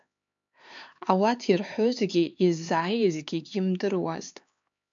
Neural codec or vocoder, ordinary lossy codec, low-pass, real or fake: codec, 16 kHz, 4 kbps, X-Codec, HuBERT features, trained on LibriSpeech; MP3, 64 kbps; 7.2 kHz; fake